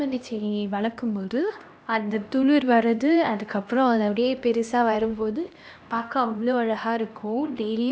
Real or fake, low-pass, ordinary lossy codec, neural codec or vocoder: fake; none; none; codec, 16 kHz, 1 kbps, X-Codec, HuBERT features, trained on LibriSpeech